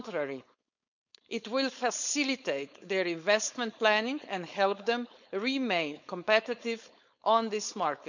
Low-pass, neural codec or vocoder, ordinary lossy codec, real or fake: 7.2 kHz; codec, 16 kHz, 4.8 kbps, FACodec; none; fake